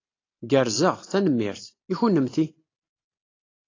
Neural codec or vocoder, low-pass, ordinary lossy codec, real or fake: none; 7.2 kHz; AAC, 32 kbps; real